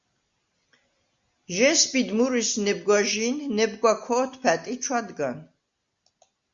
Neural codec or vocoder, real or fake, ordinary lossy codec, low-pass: none; real; Opus, 64 kbps; 7.2 kHz